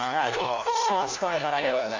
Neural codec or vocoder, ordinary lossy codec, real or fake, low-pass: codec, 16 kHz, 1 kbps, FunCodec, trained on LibriTTS, 50 frames a second; AAC, 48 kbps; fake; 7.2 kHz